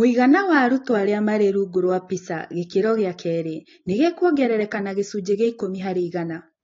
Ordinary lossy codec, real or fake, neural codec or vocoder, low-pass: AAC, 32 kbps; real; none; 7.2 kHz